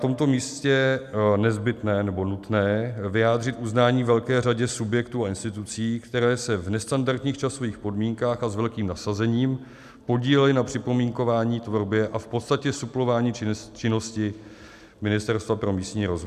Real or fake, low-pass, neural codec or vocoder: real; 14.4 kHz; none